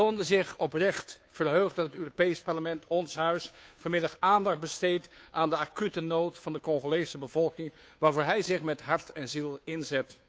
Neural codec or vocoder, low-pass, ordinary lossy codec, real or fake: codec, 16 kHz, 2 kbps, FunCodec, trained on Chinese and English, 25 frames a second; none; none; fake